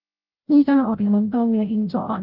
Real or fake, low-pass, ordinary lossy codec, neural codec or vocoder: fake; 5.4 kHz; Opus, 32 kbps; codec, 16 kHz, 0.5 kbps, FreqCodec, larger model